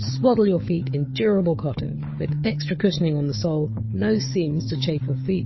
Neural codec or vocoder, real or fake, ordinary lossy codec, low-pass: codec, 16 kHz, 4 kbps, FunCodec, trained on Chinese and English, 50 frames a second; fake; MP3, 24 kbps; 7.2 kHz